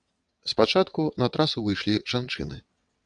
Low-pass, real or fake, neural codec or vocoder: 9.9 kHz; fake; vocoder, 22.05 kHz, 80 mel bands, WaveNeXt